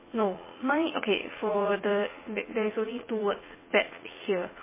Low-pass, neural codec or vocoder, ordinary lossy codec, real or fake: 3.6 kHz; vocoder, 44.1 kHz, 80 mel bands, Vocos; MP3, 16 kbps; fake